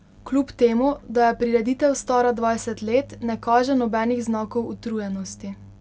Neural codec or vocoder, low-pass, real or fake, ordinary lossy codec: none; none; real; none